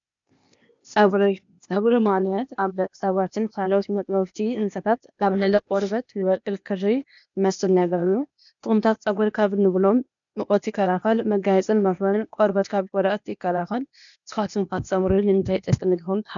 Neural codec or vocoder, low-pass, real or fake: codec, 16 kHz, 0.8 kbps, ZipCodec; 7.2 kHz; fake